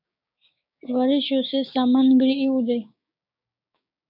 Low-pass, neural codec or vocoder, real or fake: 5.4 kHz; codec, 16 kHz, 6 kbps, DAC; fake